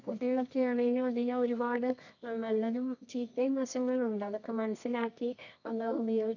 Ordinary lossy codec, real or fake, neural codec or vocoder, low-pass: none; fake; codec, 24 kHz, 0.9 kbps, WavTokenizer, medium music audio release; 7.2 kHz